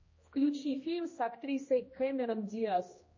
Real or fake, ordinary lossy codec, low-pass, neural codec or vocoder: fake; MP3, 32 kbps; 7.2 kHz; codec, 16 kHz, 1 kbps, X-Codec, HuBERT features, trained on general audio